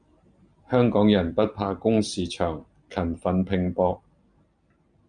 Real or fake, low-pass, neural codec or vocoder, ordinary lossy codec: real; 9.9 kHz; none; MP3, 96 kbps